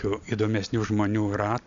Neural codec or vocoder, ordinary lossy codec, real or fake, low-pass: none; AAC, 64 kbps; real; 7.2 kHz